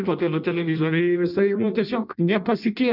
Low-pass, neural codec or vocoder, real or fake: 5.4 kHz; codec, 16 kHz in and 24 kHz out, 0.6 kbps, FireRedTTS-2 codec; fake